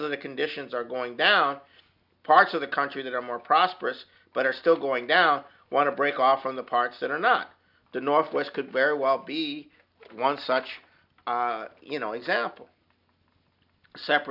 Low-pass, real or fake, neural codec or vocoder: 5.4 kHz; real; none